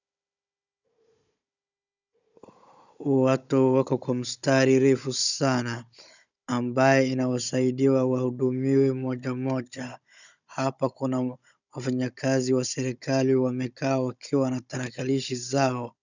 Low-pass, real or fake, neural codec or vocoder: 7.2 kHz; fake; codec, 16 kHz, 16 kbps, FunCodec, trained on Chinese and English, 50 frames a second